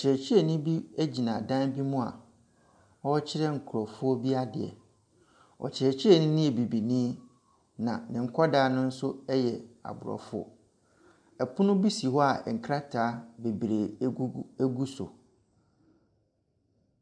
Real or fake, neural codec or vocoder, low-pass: real; none; 9.9 kHz